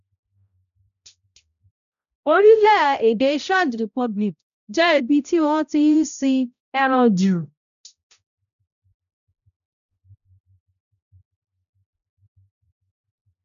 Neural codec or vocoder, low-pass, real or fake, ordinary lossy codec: codec, 16 kHz, 0.5 kbps, X-Codec, HuBERT features, trained on balanced general audio; 7.2 kHz; fake; none